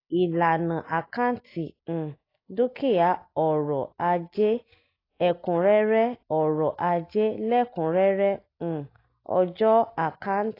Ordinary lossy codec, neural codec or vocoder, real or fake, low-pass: AAC, 24 kbps; none; real; 5.4 kHz